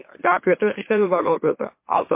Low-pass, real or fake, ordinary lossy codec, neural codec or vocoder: 3.6 kHz; fake; MP3, 24 kbps; autoencoder, 44.1 kHz, a latent of 192 numbers a frame, MeloTTS